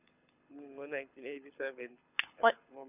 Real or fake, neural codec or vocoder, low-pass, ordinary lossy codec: fake; codec, 24 kHz, 6 kbps, HILCodec; 3.6 kHz; none